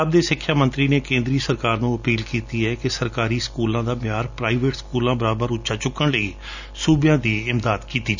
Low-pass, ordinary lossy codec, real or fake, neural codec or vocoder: 7.2 kHz; none; real; none